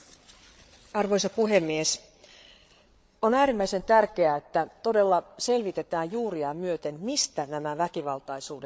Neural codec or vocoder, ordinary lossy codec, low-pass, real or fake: codec, 16 kHz, 8 kbps, FreqCodec, larger model; none; none; fake